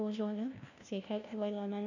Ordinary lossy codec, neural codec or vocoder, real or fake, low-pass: none; codec, 16 kHz, 1 kbps, FunCodec, trained on LibriTTS, 50 frames a second; fake; 7.2 kHz